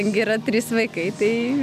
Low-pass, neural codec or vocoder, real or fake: 14.4 kHz; none; real